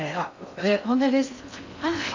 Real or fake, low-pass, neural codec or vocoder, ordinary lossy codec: fake; 7.2 kHz; codec, 16 kHz in and 24 kHz out, 0.6 kbps, FocalCodec, streaming, 2048 codes; MP3, 64 kbps